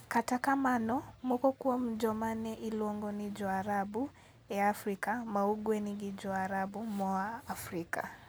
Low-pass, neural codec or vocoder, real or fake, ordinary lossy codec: none; none; real; none